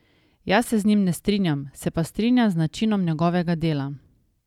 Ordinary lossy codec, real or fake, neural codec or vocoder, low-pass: none; real; none; 19.8 kHz